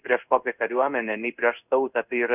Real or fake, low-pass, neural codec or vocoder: fake; 3.6 kHz; codec, 16 kHz in and 24 kHz out, 1 kbps, XY-Tokenizer